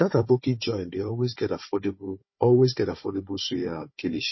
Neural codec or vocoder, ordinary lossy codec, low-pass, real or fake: codec, 16 kHz, 4 kbps, FunCodec, trained on Chinese and English, 50 frames a second; MP3, 24 kbps; 7.2 kHz; fake